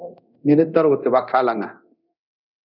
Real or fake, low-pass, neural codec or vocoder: fake; 5.4 kHz; codec, 24 kHz, 0.9 kbps, DualCodec